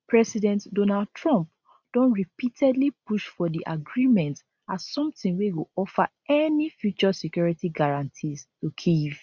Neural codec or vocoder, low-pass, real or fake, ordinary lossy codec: none; 7.2 kHz; real; none